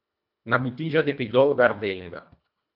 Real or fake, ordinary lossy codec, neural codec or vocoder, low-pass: fake; MP3, 48 kbps; codec, 24 kHz, 1.5 kbps, HILCodec; 5.4 kHz